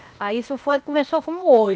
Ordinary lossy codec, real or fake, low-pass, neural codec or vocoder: none; fake; none; codec, 16 kHz, 0.8 kbps, ZipCodec